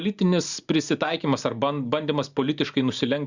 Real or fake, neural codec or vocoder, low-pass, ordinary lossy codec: real; none; 7.2 kHz; Opus, 64 kbps